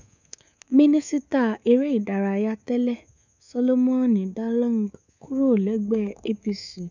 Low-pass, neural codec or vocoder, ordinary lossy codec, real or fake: 7.2 kHz; codec, 24 kHz, 3.1 kbps, DualCodec; none; fake